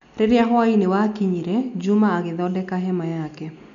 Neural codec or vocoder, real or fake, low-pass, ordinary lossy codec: none; real; 7.2 kHz; none